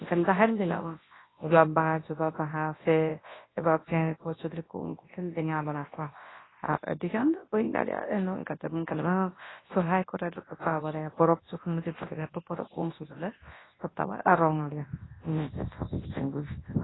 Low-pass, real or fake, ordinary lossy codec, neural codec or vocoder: 7.2 kHz; fake; AAC, 16 kbps; codec, 24 kHz, 0.9 kbps, WavTokenizer, large speech release